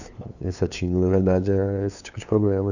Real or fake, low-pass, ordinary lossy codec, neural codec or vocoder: fake; 7.2 kHz; none; codec, 16 kHz, 2 kbps, FunCodec, trained on LibriTTS, 25 frames a second